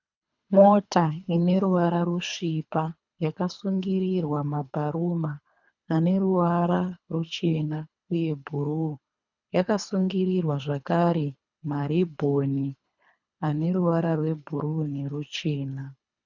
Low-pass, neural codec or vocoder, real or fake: 7.2 kHz; codec, 24 kHz, 3 kbps, HILCodec; fake